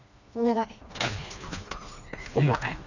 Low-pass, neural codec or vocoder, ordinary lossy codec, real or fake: 7.2 kHz; codec, 16 kHz, 2 kbps, FreqCodec, smaller model; none; fake